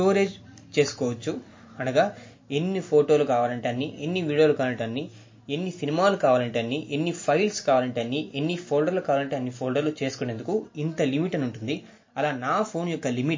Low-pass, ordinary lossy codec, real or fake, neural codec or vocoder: 7.2 kHz; MP3, 32 kbps; real; none